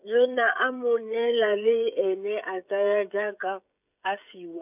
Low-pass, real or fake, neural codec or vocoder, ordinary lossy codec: 3.6 kHz; fake; codec, 16 kHz, 16 kbps, FreqCodec, smaller model; none